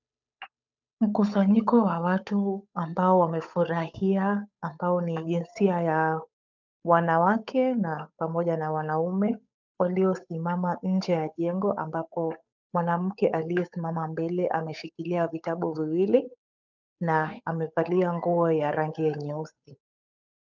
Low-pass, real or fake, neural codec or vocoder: 7.2 kHz; fake; codec, 16 kHz, 8 kbps, FunCodec, trained on Chinese and English, 25 frames a second